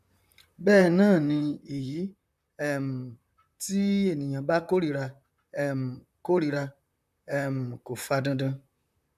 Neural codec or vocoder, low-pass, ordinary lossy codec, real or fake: vocoder, 44.1 kHz, 128 mel bands, Pupu-Vocoder; 14.4 kHz; none; fake